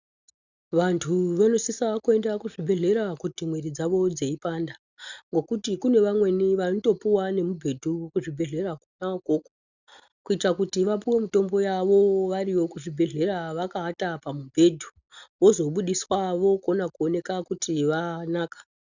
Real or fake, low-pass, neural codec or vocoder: real; 7.2 kHz; none